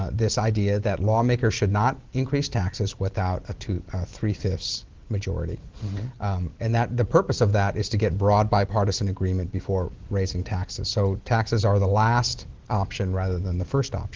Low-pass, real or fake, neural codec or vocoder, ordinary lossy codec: 7.2 kHz; real; none; Opus, 16 kbps